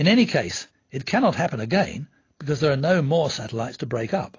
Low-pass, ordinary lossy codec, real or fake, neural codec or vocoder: 7.2 kHz; AAC, 32 kbps; real; none